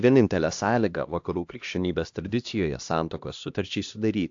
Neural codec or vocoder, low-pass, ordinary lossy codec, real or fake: codec, 16 kHz, 1 kbps, X-Codec, HuBERT features, trained on LibriSpeech; 7.2 kHz; AAC, 64 kbps; fake